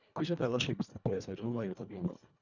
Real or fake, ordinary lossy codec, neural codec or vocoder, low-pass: fake; none; codec, 24 kHz, 1.5 kbps, HILCodec; 7.2 kHz